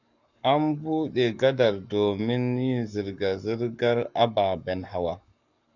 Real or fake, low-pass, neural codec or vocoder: fake; 7.2 kHz; codec, 44.1 kHz, 7.8 kbps, Pupu-Codec